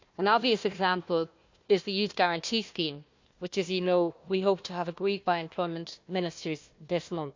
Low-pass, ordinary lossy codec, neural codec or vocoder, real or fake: 7.2 kHz; MP3, 64 kbps; codec, 16 kHz, 1 kbps, FunCodec, trained on Chinese and English, 50 frames a second; fake